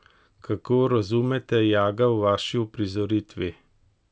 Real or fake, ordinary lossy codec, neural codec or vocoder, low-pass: real; none; none; none